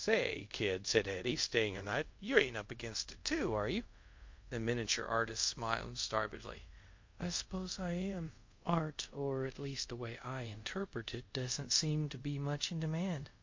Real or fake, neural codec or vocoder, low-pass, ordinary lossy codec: fake; codec, 24 kHz, 0.5 kbps, DualCodec; 7.2 kHz; MP3, 48 kbps